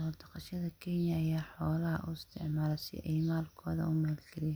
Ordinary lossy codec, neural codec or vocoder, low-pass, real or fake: none; none; none; real